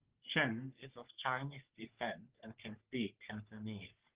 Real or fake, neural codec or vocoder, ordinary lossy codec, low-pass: fake; codec, 44.1 kHz, 3.4 kbps, Pupu-Codec; Opus, 16 kbps; 3.6 kHz